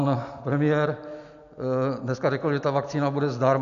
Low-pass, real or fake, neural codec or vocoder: 7.2 kHz; real; none